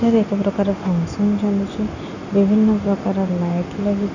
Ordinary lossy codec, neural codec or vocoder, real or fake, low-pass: none; none; real; 7.2 kHz